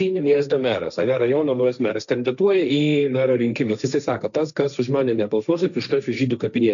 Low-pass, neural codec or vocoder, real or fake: 7.2 kHz; codec, 16 kHz, 1.1 kbps, Voila-Tokenizer; fake